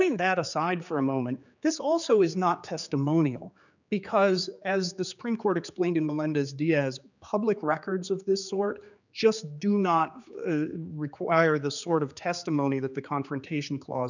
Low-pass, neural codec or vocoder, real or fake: 7.2 kHz; codec, 16 kHz, 4 kbps, X-Codec, HuBERT features, trained on general audio; fake